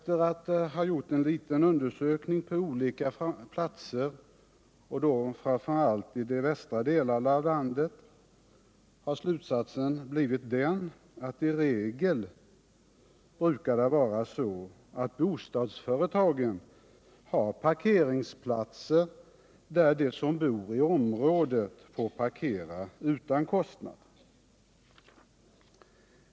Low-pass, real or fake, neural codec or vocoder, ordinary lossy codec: none; real; none; none